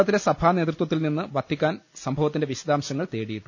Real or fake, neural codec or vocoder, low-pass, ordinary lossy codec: real; none; 7.2 kHz; none